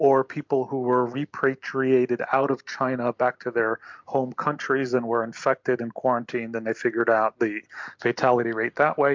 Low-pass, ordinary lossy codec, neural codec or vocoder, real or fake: 7.2 kHz; MP3, 64 kbps; none; real